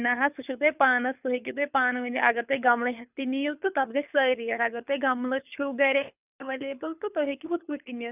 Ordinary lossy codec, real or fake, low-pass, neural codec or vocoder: none; fake; 3.6 kHz; codec, 24 kHz, 6 kbps, HILCodec